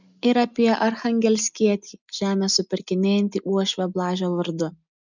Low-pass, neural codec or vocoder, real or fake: 7.2 kHz; none; real